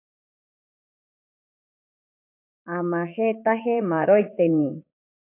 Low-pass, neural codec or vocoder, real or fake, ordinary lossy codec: 3.6 kHz; vocoder, 44.1 kHz, 80 mel bands, Vocos; fake; AAC, 24 kbps